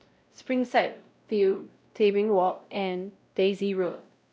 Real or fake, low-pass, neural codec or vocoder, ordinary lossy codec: fake; none; codec, 16 kHz, 0.5 kbps, X-Codec, WavLM features, trained on Multilingual LibriSpeech; none